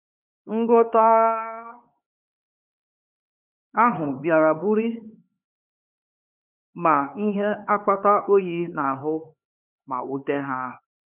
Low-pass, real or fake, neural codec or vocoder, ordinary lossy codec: 3.6 kHz; fake; codec, 16 kHz, 4 kbps, X-Codec, HuBERT features, trained on LibriSpeech; none